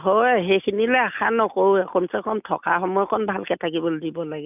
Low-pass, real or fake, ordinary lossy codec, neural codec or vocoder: 3.6 kHz; real; none; none